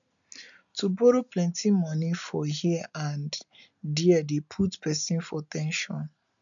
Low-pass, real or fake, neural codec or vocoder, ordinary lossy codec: 7.2 kHz; real; none; none